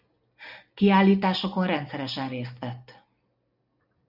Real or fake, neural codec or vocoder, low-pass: real; none; 5.4 kHz